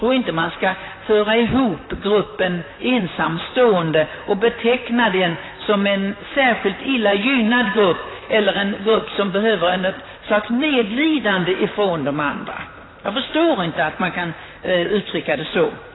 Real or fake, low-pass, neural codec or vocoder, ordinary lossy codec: fake; 7.2 kHz; vocoder, 44.1 kHz, 128 mel bands, Pupu-Vocoder; AAC, 16 kbps